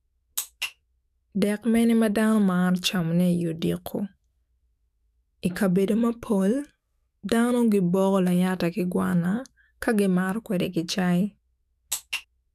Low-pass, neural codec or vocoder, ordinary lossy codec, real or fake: 14.4 kHz; autoencoder, 48 kHz, 128 numbers a frame, DAC-VAE, trained on Japanese speech; none; fake